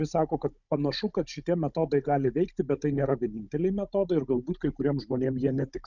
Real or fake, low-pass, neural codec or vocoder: fake; 7.2 kHz; codec, 16 kHz, 16 kbps, FunCodec, trained on Chinese and English, 50 frames a second